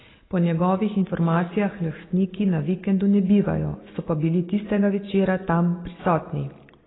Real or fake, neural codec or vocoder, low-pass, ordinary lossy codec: real; none; 7.2 kHz; AAC, 16 kbps